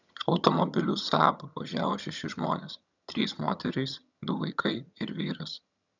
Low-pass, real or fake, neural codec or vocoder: 7.2 kHz; fake; vocoder, 22.05 kHz, 80 mel bands, HiFi-GAN